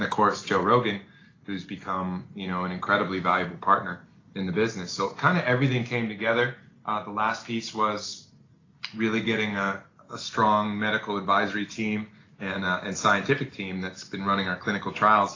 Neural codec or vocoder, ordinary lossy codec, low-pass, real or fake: none; AAC, 32 kbps; 7.2 kHz; real